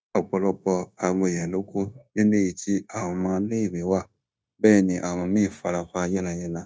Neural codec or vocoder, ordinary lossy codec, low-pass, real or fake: codec, 16 kHz, 0.9 kbps, LongCat-Audio-Codec; none; none; fake